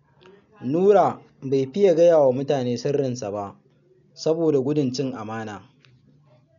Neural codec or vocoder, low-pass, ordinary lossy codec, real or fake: none; 7.2 kHz; none; real